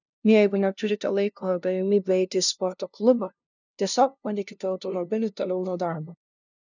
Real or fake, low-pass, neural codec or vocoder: fake; 7.2 kHz; codec, 16 kHz, 0.5 kbps, FunCodec, trained on LibriTTS, 25 frames a second